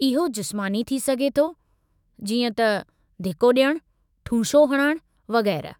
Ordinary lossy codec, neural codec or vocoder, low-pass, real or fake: none; autoencoder, 48 kHz, 128 numbers a frame, DAC-VAE, trained on Japanese speech; 19.8 kHz; fake